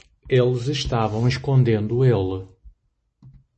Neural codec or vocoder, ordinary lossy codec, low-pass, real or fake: none; MP3, 32 kbps; 10.8 kHz; real